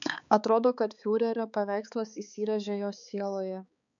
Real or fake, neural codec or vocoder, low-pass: fake; codec, 16 kHz, 4 kbps, X-Codec, HuBERT features, trained on balanced general audio; 7.2 kHz